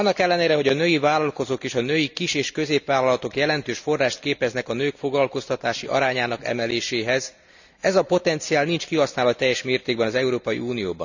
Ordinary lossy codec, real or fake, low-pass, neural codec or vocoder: none; real; 7.2 kHz; none